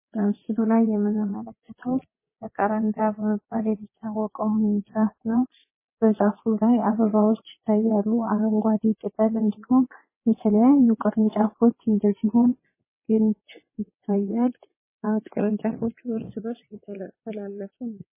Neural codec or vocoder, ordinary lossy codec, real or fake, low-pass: vocoder, 22.05 kHz, 80 mel bands, WaveNeXt; MP3, 16 kbps; fake; 3.6 kHz